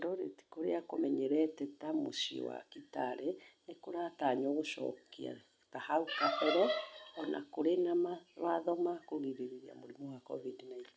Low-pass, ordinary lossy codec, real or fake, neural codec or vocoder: none; none; real; none